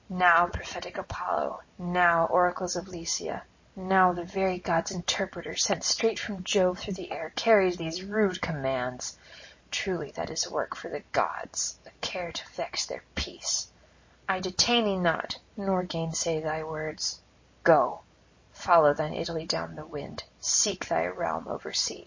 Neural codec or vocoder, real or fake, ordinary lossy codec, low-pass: none; real; MP3, 32 kbps; 7.2 kHz